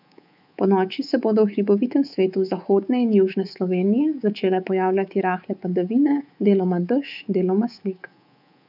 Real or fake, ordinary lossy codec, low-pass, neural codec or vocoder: fake; none; 5.4 kHz; codec, 24 kHz, 3.1 kbps, DualCodec